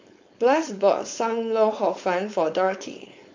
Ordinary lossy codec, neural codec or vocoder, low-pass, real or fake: MP3, 48 kbps; codec, 16 kHz, 4.8 kbps, FACodec; 7.2 kHz; fake